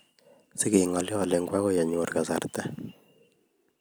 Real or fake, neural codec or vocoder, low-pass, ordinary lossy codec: real; none; none; none